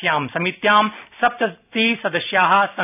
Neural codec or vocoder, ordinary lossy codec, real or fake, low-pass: none; none; real; 3.6 kHz